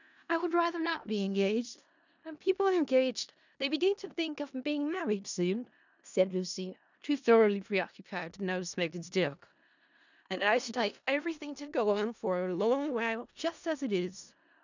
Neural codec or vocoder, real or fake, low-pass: codec, 16 kHz in and 24 kHz out, 0.4 kbps, LongCat-Audio-Codec, four codebook decoder; fake; 7.2 kHz